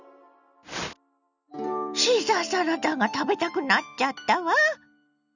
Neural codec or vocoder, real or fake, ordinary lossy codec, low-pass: none; real; none; 7.2 kHz